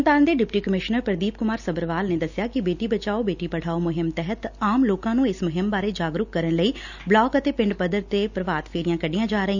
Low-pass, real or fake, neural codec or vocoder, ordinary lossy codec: 7.2 kHz; real; none; none